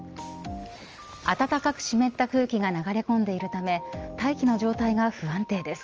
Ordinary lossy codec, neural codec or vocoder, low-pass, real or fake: Opus, 24 kbps; none; 7.2 kHz; real